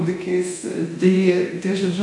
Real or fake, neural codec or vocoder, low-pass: fake; codec, 24 kHz, 0.9 kbps, DualCodec; 10.8 kHz